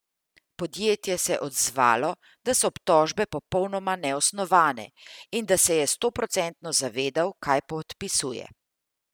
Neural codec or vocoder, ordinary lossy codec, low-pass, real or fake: none; none; none; real